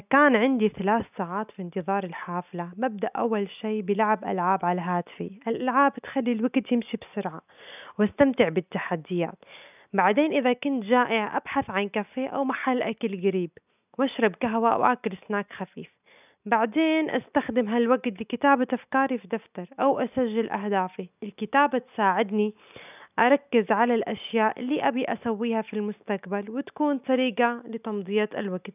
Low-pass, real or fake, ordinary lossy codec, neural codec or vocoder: 3.6 kHz; real; none; none